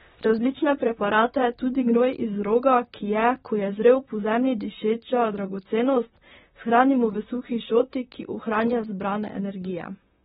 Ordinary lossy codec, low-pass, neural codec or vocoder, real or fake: AAC, 16 kbps; 19.8 kHz; vocoder, 44.1 kHz, 128 mel bands, Pupu-Vocoder; fake